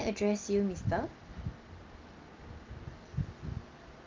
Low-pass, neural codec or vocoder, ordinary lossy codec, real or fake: 7.2 kHz; none; Opus, 24 kbps; real